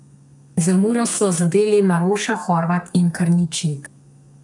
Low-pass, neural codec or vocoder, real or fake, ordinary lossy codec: 10.8 kHz; codec, 32 kHz, 1.9 kbps, SNAC; fake; none